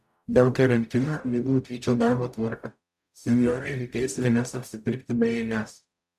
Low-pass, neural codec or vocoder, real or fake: 14.4 kHz; codec, 44.1 kHz, 0.9 kbps, DAC; fake